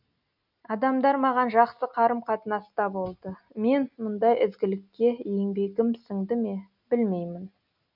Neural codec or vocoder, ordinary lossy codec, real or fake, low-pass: none; none; real; 5.4 kHz